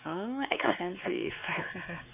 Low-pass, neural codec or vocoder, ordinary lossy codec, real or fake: 3.6 kHz; codec, 16 kHz, 4 kbps, X-Codec, WavLM features, trained on Multilingual LibriSpeech; none; fake